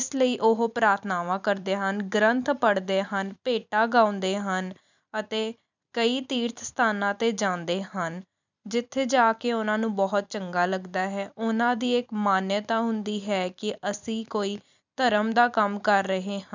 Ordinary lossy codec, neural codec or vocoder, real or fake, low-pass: none; vocoder, 44.1 kHz, 128 mel bands every 256 samples, BigVGAN v2; fake; 7.2 kHz